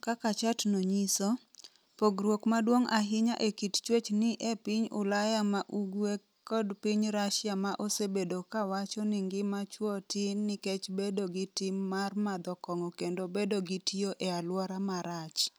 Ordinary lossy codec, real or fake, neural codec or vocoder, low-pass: none; real; none; none